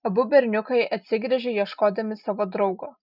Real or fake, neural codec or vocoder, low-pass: real; none; 5.4 kHz